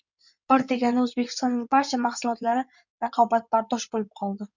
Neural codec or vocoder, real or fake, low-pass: codec, 16 kHz in and 24 kHz out, 2.2 kbps, FireRedTTS-2 codec; fake; 7.2 kHz